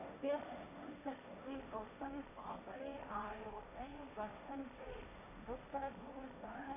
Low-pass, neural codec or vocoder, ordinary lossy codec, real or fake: 3.6 kHz; codec, 16 kHz, 1.1 kbps, Voila-Tokenizer; none; fake